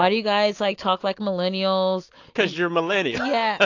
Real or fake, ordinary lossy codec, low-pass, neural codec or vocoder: real; AAC, 48 kbps; 7.2 kHz; none